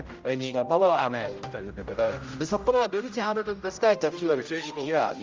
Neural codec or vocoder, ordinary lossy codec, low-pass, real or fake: codec, 16 kHz, 0.5 kbps, X-Codec, HuBERT features, trained on general audio; Opus, 32 kbps; 7.2 kHz; fake